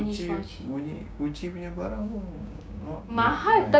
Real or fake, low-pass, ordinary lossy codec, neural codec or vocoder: fake; none; none; codec, 16 kHz, 6 kbps, DAC